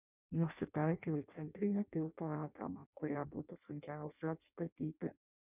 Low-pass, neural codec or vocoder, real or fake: 3.6 kHz; codec, 16 kHz in and 24 kHz out, 0.6 kbps, FireRedTTS-2 codec; fake